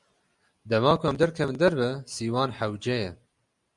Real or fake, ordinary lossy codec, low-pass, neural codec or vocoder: real; Opus, 64 kbps; 10.8 kHz; none